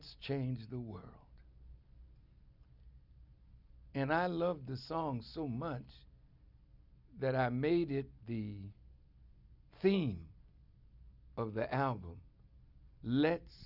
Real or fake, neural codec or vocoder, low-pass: real; none; 5.4 kHz